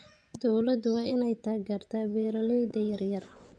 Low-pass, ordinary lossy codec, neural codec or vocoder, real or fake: 9.9 kHz; none; vocoder, 24 kHz, 100 mel bands, Vocos; fake